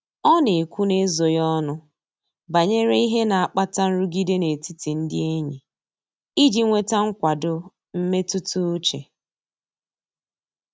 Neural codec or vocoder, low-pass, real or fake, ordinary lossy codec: none; none; real; none